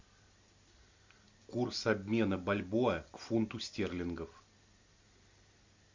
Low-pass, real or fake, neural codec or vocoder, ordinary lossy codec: 7.2 kHz; real; none; MP3, 64 kbps